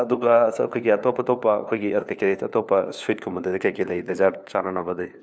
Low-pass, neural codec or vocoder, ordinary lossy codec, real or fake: none; codec, 16 kHz, 4 kbps, FunCodec, trained on LibriTTS, 50 frames a second; none; fake